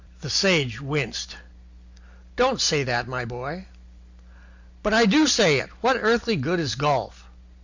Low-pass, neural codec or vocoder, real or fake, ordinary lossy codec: 7.2 kHz; none; real; Opus, 64 kbps